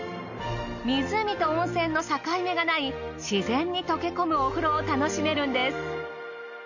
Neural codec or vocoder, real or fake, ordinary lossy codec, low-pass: none; real; none; 7.2 kHz